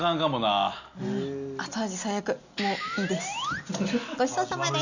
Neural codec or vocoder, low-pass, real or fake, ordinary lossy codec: none; 7.2 kHz; real; none